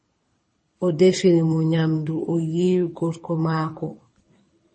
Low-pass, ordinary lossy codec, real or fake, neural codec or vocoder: 9.9 kHz; MP3, 32 kbps; fake; codec, 24 kHz, 6 kbps, HILCodec